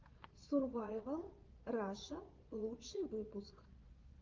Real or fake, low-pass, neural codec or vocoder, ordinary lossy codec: fake; 7.2 kHz; vocoder, 22.05 kHz, 80 mel bands, Vocos; Opus, 32 kbps